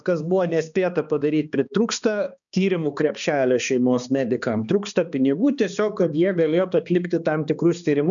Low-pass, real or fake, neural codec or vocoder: 7.2 kHz; fake; codec, 16 kHz, 2 kbps, X-Codec, HuBERT features, trained on balanced general audio